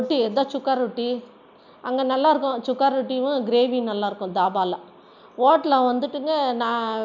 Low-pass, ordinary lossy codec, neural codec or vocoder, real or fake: 7.2 kHz; none; none; real